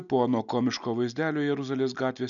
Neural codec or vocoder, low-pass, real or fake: none; 7.2 kHz; real